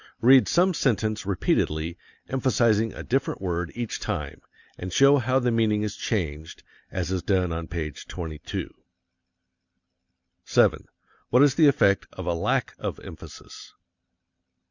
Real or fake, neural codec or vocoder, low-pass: real; none; 7.2 kHz